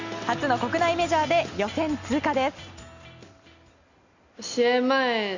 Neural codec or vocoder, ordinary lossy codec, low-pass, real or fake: none; Opus, 64 kbps; 7.2 kHz; real